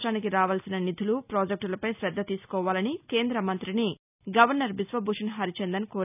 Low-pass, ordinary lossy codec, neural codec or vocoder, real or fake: 3.6 kHz; none; none; real